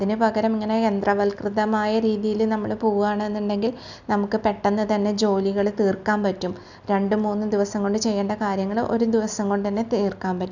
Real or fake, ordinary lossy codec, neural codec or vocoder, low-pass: real; none; none; 7.2 kHz